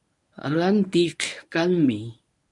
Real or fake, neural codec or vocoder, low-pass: fake; codec, 24 kHz, 0.9 kbps, WavTokenizer, medium speech release version 1; 10.8 kHz